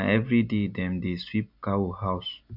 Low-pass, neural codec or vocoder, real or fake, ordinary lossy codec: 5.4 kHz; none; real; none